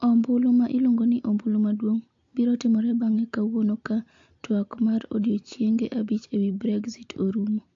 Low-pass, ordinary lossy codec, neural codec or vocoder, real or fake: 7.2 kHz; AAC, 48 kbps; none; real